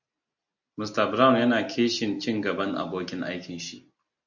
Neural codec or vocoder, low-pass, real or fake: none; 7.2 kHz; real